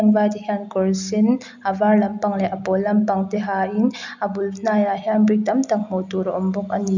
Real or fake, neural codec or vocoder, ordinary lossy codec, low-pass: real; none; none; 7.2 kHz